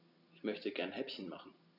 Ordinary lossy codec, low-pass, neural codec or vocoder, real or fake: none; 5.4 kHz; none; real